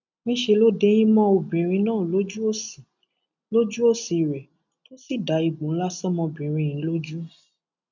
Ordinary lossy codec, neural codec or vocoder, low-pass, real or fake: none; none; 7.2 kHz; real